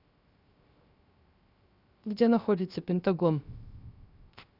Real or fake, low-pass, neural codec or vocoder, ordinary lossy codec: fake; 5.4 kHz; codec, 16 kHz, 0.3 kbps, FocalCodec; Opus, 64 kbps